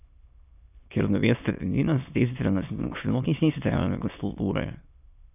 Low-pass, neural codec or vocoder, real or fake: 3.6 kHz; autoencoder, 22.05 kHz, a latent of 192 numbers a frame, VITS, trained on many speakers; fake